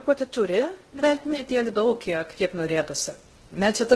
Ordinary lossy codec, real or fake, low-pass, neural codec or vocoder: Opus, 16 kbps; fake; 10.8 kHz; codec, 16 kHz in and 24 kHz out, 0.6 kbps, FocalCodec, streaming, 2048 codes